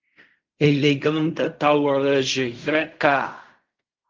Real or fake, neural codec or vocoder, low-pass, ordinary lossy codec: fake; codec, 16 kHz in and 24 kHz out, 0.4 kbps, LongCat-Audio-Codec, fine tuned four codebook decoder; 7.2 kHz; Opus, 24 kbps